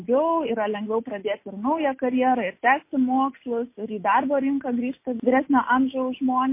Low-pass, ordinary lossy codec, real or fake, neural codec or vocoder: 3.6 kHz; MP3, 24 kbps; real; none